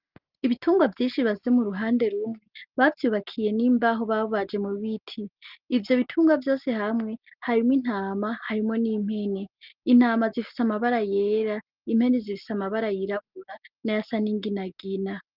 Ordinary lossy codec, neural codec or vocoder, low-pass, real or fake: Opus, 24 kbps; none; 5.4 kHz; real